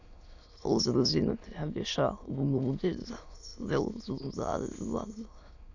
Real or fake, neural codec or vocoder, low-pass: fake; autoencoder, 22.05 kHz, a latent of 192 numbers a frame, VITS, trained on many speakers; 7.2 kHz